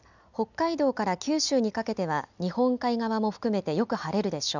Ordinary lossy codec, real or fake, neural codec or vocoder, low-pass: none; real; none; 7.2 kHz